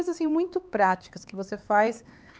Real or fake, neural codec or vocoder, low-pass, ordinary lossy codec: fake; codec, 16 kHz, 4 kbps, X-Codec, HuBERT features, trained on LibriSpeech; none; none